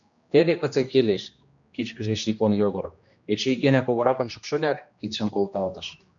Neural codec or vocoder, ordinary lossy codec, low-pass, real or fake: codec, 16 kHz, 1 kbps, X-Codec, HuBERT features, trained on general audio; MP3, 64 kbps; 7.2 kHz; fake